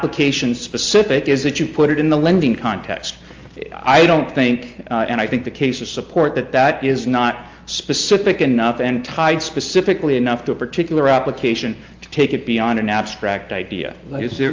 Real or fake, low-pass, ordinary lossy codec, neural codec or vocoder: real; 7.2 kHz; Opus, 32 kbps; none